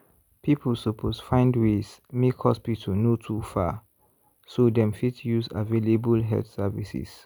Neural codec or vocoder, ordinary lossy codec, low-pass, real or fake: none; none; none; real